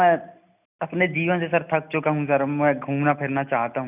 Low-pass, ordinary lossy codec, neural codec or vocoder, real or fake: 3.6 kHz; none; none; real